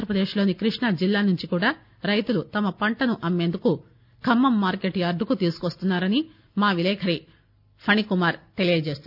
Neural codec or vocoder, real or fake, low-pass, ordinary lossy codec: none; real; 5.4 kHz; none